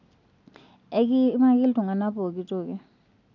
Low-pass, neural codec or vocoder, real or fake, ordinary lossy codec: 7.2 kHz; none; real; none